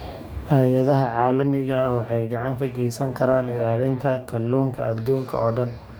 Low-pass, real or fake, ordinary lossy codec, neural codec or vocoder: none; fake; none; codec, 44.1 kHz, 2.6 kbps, DAC